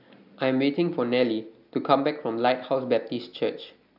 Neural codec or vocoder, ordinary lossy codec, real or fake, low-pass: none; AAC, 48 kbps; real; 5.4 kHz